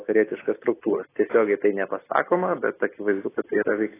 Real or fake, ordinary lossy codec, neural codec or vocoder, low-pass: real; AAC, 16 kbps; none; 3.6 kHz